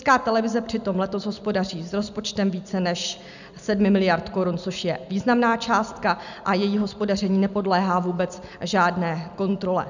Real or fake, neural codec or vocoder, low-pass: real; none; 7.2 kHz